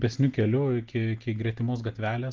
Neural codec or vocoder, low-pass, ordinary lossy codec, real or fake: none; 7.2 kHz; Opus, 16 kbps; real